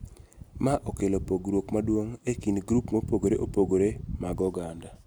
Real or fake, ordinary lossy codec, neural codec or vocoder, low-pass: real; none; none; none